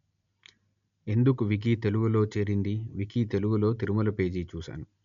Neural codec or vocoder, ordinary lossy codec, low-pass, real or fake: none; none; 7.2 kHz; real